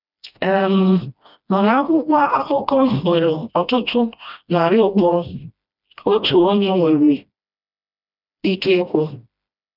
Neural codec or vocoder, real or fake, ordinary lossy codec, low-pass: codec, 16 kHz, 1 kbps, FreqCodec, smaller model; fake; none; 5.4 kHz